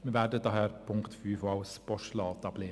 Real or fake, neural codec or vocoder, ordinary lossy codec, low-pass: real; none; none; none